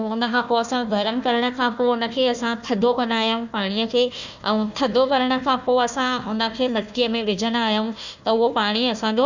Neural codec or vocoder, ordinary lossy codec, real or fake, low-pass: codec, 16 kHz, 1 kbps, FunCodec, trained on Chinese and English, 50 frames a second; none; fake; 7.2 kHz